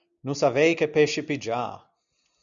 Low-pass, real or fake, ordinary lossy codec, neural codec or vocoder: 7.2 kHz; real; MP3, 96 kbps; none